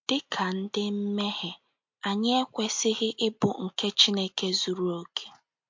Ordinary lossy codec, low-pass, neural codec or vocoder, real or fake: MP3, 48 kbps; 7.2 kHz; none; real